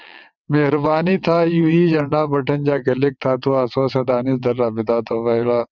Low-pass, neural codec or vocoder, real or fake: 7.2 kHz; vocoder, 22.05 kHz, 80 mel bands, WaveNeXt; fake